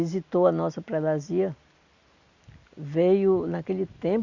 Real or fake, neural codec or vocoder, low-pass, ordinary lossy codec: real; none; 7.2 kHz; Opus, 64 kbps